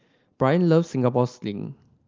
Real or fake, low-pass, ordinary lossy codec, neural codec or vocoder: real; 7.2 kHz; Opus, 32 kbps; none